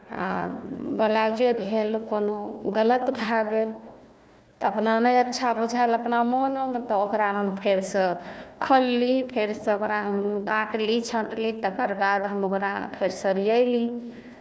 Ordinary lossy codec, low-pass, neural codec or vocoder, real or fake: none; none; codec, 16 kHz, 1 kbps, FunCodec, trained on Chinese and English, 50 frames a second; fake